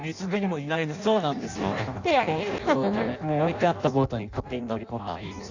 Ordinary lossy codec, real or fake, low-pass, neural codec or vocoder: Opus, 64 kbps; fake; 7.2 kHz; codec, 16 kHz in and 24 kHz out, 0.6 kbps, FireRedTTS-2 codec